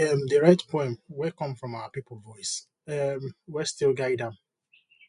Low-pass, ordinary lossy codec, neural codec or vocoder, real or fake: 10.8 kHz; none; none; real